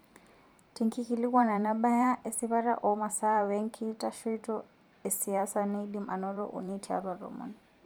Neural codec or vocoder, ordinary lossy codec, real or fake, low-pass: vocoder, 44.1 kHz, 128 mel bands every 512 samples, BigVGAN v2; none; fake; none